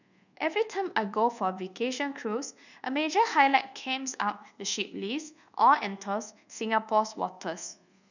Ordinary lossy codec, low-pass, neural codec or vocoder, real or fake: none; 7.2 kHz; codec, 24 kHz, 1.2 kbps, DualCodec; fake